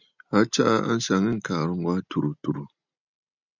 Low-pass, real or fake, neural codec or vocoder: 7.2 kHz; real; none